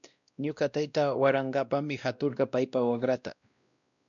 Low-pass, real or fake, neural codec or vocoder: 7.2 kHz; fake; codec, 16 kHz, 1 kbps, X-Codec, WavLM features, trained on Multilingual LibriSpeech